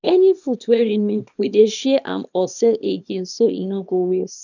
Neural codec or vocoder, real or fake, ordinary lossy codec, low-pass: codec, 24 kHz, 0.9 kbps, WavTokenizer, small release; fake; none; 7.2 kHz